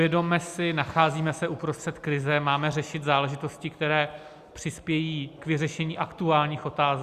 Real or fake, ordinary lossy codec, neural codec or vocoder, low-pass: real; Opus, 64 kbps; none; 14.4 kHz